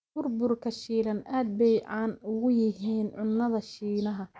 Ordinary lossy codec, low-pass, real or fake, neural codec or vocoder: none; none; real; none